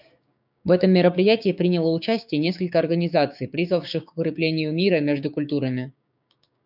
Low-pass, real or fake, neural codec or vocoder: 5.4 kHz; fake; codec, 16 kHz, 6 kbps, DAC